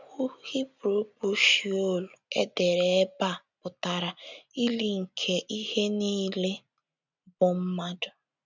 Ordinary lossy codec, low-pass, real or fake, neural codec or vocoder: none; 7.2 kHz; real; none